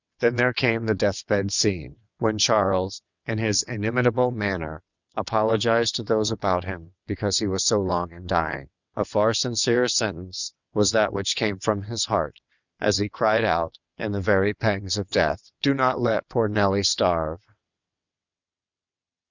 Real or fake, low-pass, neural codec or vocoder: fake; 7.2 kHz; vocoder, 22.05 kHz, 80 mel bands, WaveNeXt